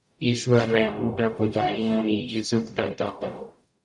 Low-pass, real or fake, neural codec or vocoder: 10.8 kHz; fake; codec, 44.1 kHz, 0.9 kbps, DAC